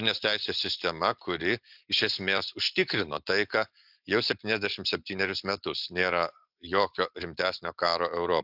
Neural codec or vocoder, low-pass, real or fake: none; 5.4 kHz; real